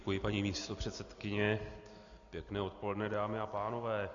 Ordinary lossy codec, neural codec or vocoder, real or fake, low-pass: MP3, 96 kbps; none; real; 7.2 kHz